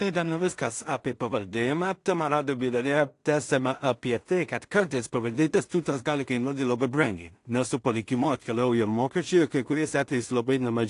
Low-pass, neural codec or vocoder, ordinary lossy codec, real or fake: 10.8 kHz; codec, 16 kHz in and 24 kHz out, 0.4 kbps, LongCat-Audio-Codec, two codebook decoder; AAC, 48 kbps; fake